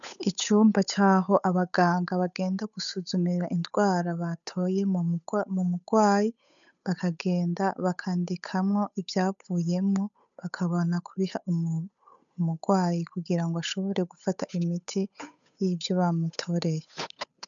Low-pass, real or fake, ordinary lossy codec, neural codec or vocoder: 7.2 kHz; fake; MP3, 96 kbps; codec, 16 kHz, 8 kbps, FunCodec, trained on Chinese and English, 25 frames a second